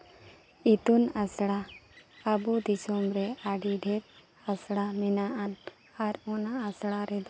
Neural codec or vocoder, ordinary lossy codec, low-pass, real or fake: none; none; none; real